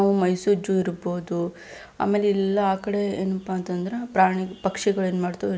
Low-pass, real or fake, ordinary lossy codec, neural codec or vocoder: none; real; none; none